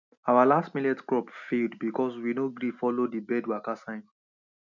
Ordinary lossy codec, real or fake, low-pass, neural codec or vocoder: none; real; 7.2 kHz; none